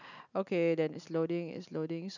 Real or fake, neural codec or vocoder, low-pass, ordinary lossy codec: real; none; 7.2 kHz; none